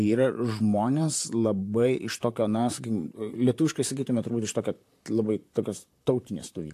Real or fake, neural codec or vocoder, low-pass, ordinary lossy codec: fake; vocoder, 44.1 kHz, 128 mel bands, Pupu-Vocoder; 14.4 kHz; AAC, 64 kbps